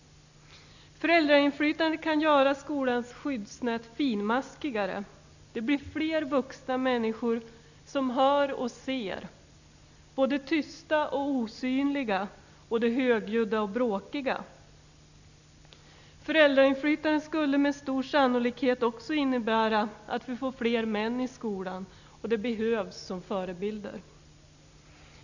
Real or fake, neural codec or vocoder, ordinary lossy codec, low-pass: real; none; none; 7.2 kHz